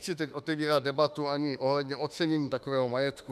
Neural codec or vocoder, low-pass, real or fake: autoencoder, 48 kHz, 32 numbers a frame, DAC-VAE, trained on Japanese speech; 14.4 kHz; fake